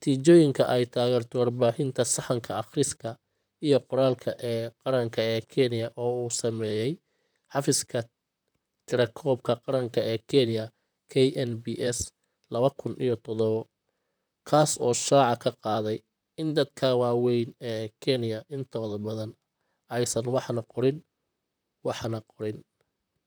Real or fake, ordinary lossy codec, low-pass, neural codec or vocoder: fake; none; none; vocoder, 44.1 kHz, 128 mel bands, Pupu-Vocoder